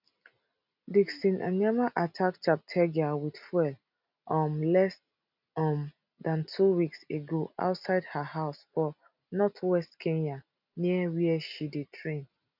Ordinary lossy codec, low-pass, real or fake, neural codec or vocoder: none; 5.4 kHz; real; none